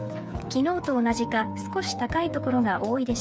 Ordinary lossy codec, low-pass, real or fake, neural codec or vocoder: none; none; fake; codec, 16 kHz, 8 kbps, FreqCodec, smaller model